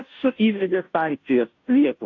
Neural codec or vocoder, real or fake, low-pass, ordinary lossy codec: codec, 16 kHz, 0.5 kbps, FunCodec, trained on Chinese and English, 25 frames a second; fake; 7.2 kHz; AAC, 48 kbps